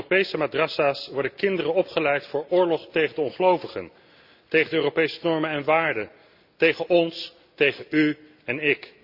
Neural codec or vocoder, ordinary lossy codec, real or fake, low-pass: none; Opus, 64 kbps; real; 5.4 kHz